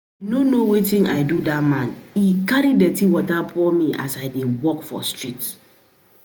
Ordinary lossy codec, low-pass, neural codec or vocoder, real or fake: none; none; vocoder, 48 kHz, 128 mel bands, Vocos; fake